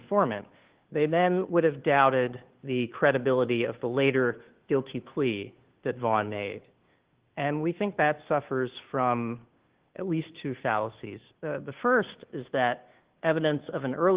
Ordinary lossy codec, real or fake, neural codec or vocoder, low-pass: Opus, 16 kbps; fake; codec, 16 kHz, 2 kbps, FunCodec, trained on Chinese and English, 25 frames a second; 3.6 kHz